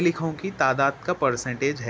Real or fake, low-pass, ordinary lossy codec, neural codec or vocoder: real; none; none; none